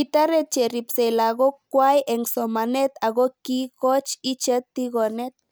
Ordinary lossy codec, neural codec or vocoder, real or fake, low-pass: none; none; real; none